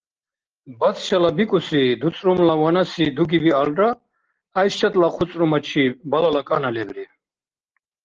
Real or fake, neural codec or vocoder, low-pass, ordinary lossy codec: real; none; 7.2 kHz; Opus, 16 kbps